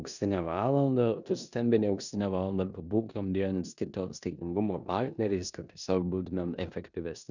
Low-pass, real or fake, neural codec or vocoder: 7.2 kHz; fake; codec, 16 kHz in and 24 kHz out, 0.9 kbps, LongCat-Audio-Codec, four codebook decoder